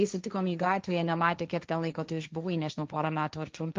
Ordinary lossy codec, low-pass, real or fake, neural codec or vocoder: Opus, 24 kbps; 7.2 kHz; fake; codec, 16 kHz, 1.1 kbps, Voila-Tokenizer